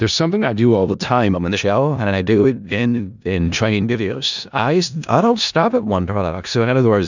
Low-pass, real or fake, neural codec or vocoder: 7.2 kHz; fake; codec, 16 kHz in and 24 kHz out, 0.4 kbps, LongCat-Audio-Codec, four codebook decoder